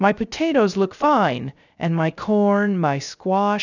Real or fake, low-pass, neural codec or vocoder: fake; 7.2 kHz; codec, 16 kHz, 0.3 kbps, FocalCodec